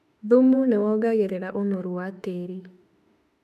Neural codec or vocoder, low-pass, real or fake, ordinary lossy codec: autoencoder, 48 kHz, 32 numbers a frame, DAC-VAE, trained on Japanese speech; 14.4 kHz; fake; none